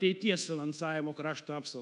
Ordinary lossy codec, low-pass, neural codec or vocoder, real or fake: AAC, 96 kbps; 10.8 kHz; codec, 24 kHz, 1.2 kbps, DualCodec; fake